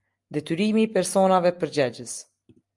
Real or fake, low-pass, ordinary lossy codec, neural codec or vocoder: real; 10.8 kHz; Opus, 32 kbps; none